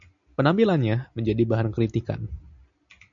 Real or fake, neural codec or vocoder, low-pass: real; none; 7.2 kHz